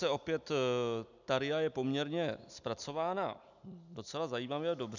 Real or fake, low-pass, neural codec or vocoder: real; 7.2 kHz; none